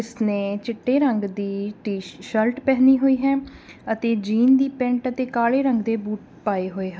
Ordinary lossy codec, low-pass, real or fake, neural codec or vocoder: none; none; real; none